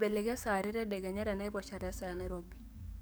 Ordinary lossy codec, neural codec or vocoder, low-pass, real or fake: none; codec, 44.1 kHz, 7.8 kbps, DAC; none; fake